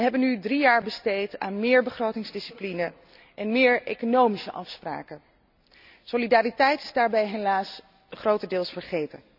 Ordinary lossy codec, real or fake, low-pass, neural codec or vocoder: none; real; 5.4 kHz; none